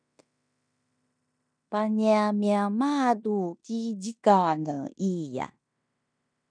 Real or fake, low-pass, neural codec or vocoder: fake; 9.9 kHz; codec, 16 kHz in and 24 kHz out, 0.9 kbps, LongCat-Audio-Codec, fine tuned four codebook decoder